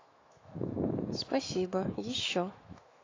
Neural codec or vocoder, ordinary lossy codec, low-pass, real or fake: autoencoder, 48 kHz, 128 numbers a frame, DAC-VAE, trained on Japanese speech; AAC, 32 kbps; 7.2 kHz; fake